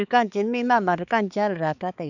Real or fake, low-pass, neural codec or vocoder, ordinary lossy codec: fake; 7.2 kHz; codec, 16 kHz, 4 kbps, X-Codec, HuBERT features, trained on balanced general audio; none